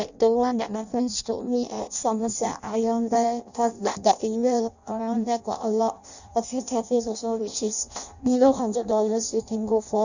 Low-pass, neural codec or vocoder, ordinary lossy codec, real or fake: 7.2 kHz; codec, 16 kHz in and 24 kHz out, 0.6 kbps, FireRedTTS-2 codec; none; fake